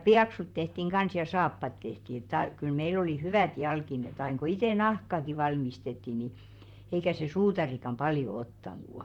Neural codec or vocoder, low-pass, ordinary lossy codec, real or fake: vocoder, 44.1 kHz, 128 mel bands, Pupu-Vocoder; 19.8 kHz; none; fake